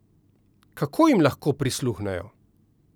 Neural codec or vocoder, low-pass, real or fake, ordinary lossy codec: none; none; real; none